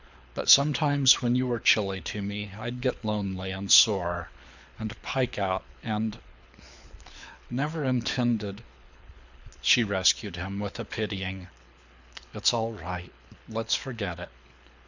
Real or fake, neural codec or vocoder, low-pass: fake; codec, 24 kHz, 6 kbps, HILCodec; 7.2 kHz